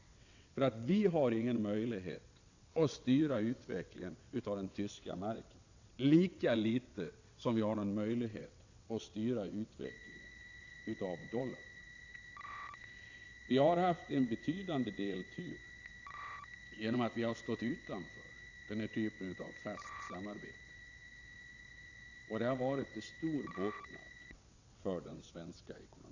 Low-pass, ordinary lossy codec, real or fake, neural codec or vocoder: 7.2 kHz; AAC, 48 kbps; fake; vocoder, 22.05 kHz, 80 mel bands, WaveNeXt